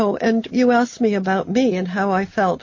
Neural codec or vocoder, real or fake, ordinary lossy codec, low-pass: none; real; MP3, 32 kbps; 7.2 kHz